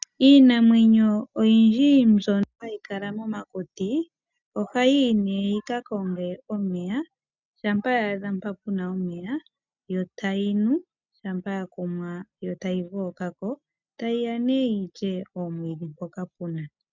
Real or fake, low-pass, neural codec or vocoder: real; 7.2 kHz; none